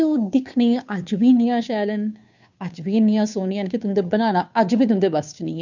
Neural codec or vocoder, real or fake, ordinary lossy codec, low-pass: codec, 16 kHz, 2 kbps, FunCodec, trained on Chinese and English, 25 frames a second; fake; none; 7.2 kHz